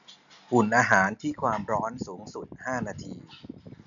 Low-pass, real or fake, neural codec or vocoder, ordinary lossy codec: 7.2 kHz; real; none; none